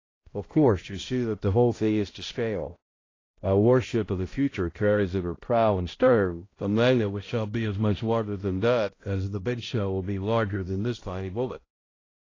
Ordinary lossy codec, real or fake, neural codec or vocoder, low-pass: AAC, 32 kbps; fake; codec, 16 kHz, 0.5 kbps, X-Codec, HuBERT features, trained on balanced general audio; 7.2 kHz